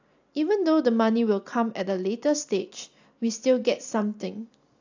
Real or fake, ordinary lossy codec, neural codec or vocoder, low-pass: real; AAC, 48 kbps; none; 7.2 kHz